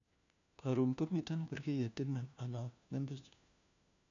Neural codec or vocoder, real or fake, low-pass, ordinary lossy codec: codec, 16 kHz, 1 kbps, FunCodec, trained on LibriTTS, 50 frames a second; fake; 7.2 kHz; none